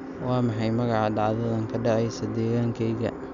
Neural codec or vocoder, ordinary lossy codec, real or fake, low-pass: none; none; real; 7.2 kHz